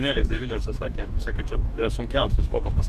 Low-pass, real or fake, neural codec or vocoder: 14.4 kHz; fake; codec, 44.1 kHz, 2.6 kbps, DAC